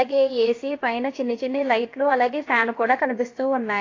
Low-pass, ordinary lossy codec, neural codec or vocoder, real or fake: 7.2 kHz; AAC, 32 kbps; codec, 16 kHz, 0.7 kbps, FocalCodec; fake